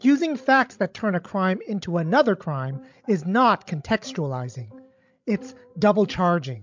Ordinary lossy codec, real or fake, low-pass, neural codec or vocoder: MP3, 64 kbps; fake; 7.2 kHz; codec, 16 kHz, 16 kbps, FreqCodec, larger model